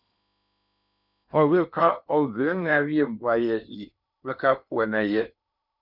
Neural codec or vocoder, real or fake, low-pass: codec, 16 kHz in and 24 kHz out, 0.8 kbps, FocalCodec, streaming, 65536 codes; fake; 5.4 kHz